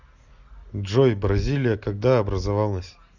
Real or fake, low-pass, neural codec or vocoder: real; 7.2 kHz; none